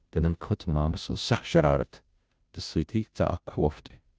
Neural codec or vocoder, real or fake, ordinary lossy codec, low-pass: codec, 16 kHz, 0.5 kbps, FunCodec, trained on Chinese and English, 25 frames a second; fake; none; none